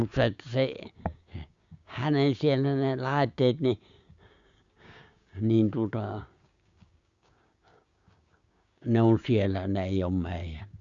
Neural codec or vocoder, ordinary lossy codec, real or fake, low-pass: codec, 16 kHz, 6 kbps, DAC; Opus, 64 kbps; fake; 7.2 kHz